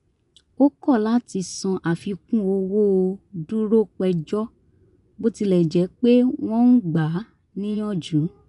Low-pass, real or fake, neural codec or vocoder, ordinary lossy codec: 10.8 kHz; fake; vocoder, 24 kHz, 100 mel bands, Vocos; none